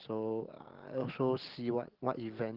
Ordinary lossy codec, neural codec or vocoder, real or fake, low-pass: Opus, 16 kbps; none; real; 5.4 kHz